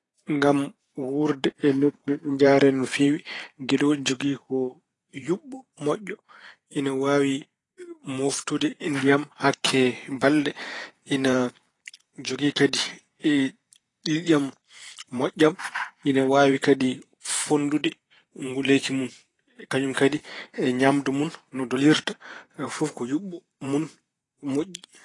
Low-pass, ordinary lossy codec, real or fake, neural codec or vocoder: 10.8 kHz; AAC, 32 kbps; fake; vocoder, 44.1 kHz, 128 mel bands every 512 samples, BigVGAN v2